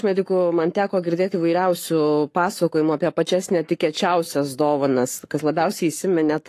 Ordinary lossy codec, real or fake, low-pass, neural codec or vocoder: AAC, 48 kbps; fake; 14.4 kHz; codec, 44.1 kHz, 7.8 kbps, Pupu-Codec